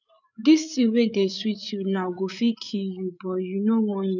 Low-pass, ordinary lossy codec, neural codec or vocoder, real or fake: 7.2 kHz; none; codec, 16 kHz, 8 kbps, FreqCodec, larger model; fake